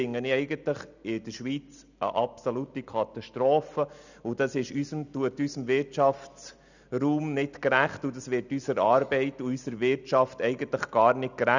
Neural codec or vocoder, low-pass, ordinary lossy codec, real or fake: none; 7.2 kHz; none; real